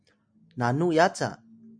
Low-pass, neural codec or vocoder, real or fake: 9.9 kHz; none; real